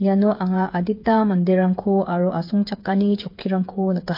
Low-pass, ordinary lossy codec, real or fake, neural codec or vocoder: 5.4 kHz; MP3, 32 kbps; fake; codec, 16 kHz, 8 kbps, FreqCodec, smaller model